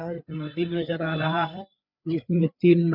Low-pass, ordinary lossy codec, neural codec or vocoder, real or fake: 5.4 kHz; none; codec, 16 kHz, 4 kbps, FreqCodec, larger model; fake